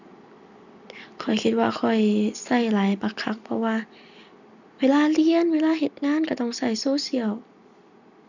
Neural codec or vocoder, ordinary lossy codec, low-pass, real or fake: none; none; 7.2 kHz; real